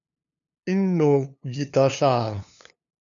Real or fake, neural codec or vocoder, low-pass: fake; codec, 16 kHz, 2 kbps, FunCodec, trained on LibriTTS, 25 frames a second; 7.2 kHz